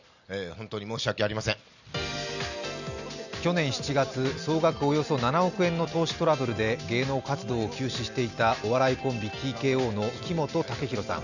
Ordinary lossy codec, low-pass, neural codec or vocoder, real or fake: none; 7.2 kHz; none; real